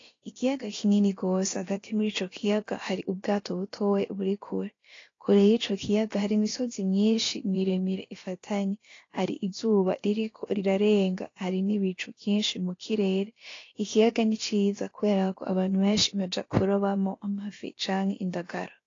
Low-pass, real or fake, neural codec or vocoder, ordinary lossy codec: 7.2 kHz; fake; codec, 16 kHz, about 1 kbps, DyCAST, with the encoder's durations; AAC, 32 kbps